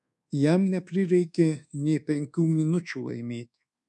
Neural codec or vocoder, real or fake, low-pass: codec, 24 kHz, 1.2 kbps, DualCodec; fake; 10.8 kHz